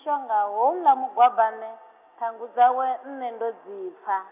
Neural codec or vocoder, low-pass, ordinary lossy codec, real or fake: none; 3.6 kHz; none; real